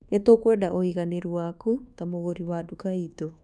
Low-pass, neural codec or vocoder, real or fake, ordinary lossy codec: none; codec, 24 kHz, 1.2 kbps, DualCodec; fake; none